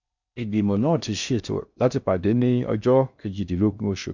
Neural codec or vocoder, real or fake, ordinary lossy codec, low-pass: codec, 16 kHz in and 24 kHz out, 0.6 kbps, FocalCodec, streaming, 4096 codes; fake; none; 7.2 kHz